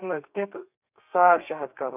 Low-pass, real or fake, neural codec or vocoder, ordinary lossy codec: 3.6 kHz; fake; codec, 32 kHz, 1.9 kbps, SNAC; none